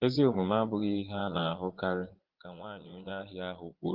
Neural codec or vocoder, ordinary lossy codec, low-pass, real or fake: vocoder, 22.05 kHz, 80 mel bands, Vocos; Opus, 16 kbps; 5.4 kHz; fake